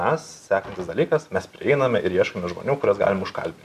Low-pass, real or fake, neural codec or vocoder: 14.4 kHz; real; none